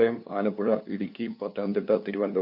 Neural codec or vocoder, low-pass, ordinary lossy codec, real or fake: codec, 16 kHz, 2 kbps, FunCodec, trained on LibriTTS, 25 frames a second; 5.4 kHz; none; fake